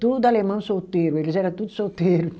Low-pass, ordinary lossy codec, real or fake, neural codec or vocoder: none; none; real; none